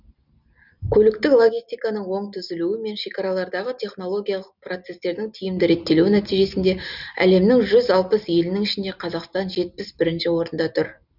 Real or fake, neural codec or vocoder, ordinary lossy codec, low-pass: real; none; none; 5.4 kHz